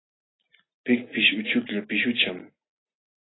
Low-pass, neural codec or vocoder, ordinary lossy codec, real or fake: 7.2 kHz; none; AAC, 16 kbps; real